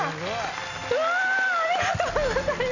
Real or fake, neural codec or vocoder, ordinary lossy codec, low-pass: real; none; none; 7.2 kHz